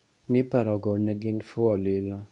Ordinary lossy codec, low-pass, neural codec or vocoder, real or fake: none; 10.8 kHz; codec, 24 kHz, 0.9 kbps, WavTokenizer, medium speech release version 2; fake